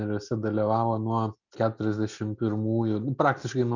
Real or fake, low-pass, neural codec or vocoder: real; 7.2 kHz; none